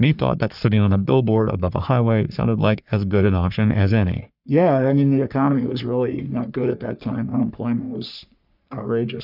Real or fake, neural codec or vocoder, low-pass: fake; codec, 44.1 kHz, 3.4 kbps, Pupu-Codec; 5.4 kHz